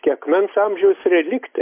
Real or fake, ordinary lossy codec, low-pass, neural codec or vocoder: real; MP3, 24 kbps; 3.6 kHz; none